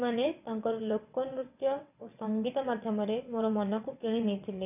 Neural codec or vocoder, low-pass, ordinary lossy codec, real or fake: vocoder, 22.05 kHz, 80 mel bands, WaveNeXt; 3.6 kHz; none; fake